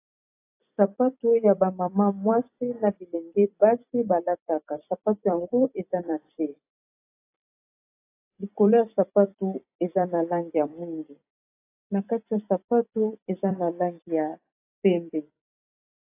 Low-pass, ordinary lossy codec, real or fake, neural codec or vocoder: 3.6 kHz; AAC, 24 kbps; fake; vocoder, 44.1 kHz, 128 mel bands every 256 samples, BigVGAN v2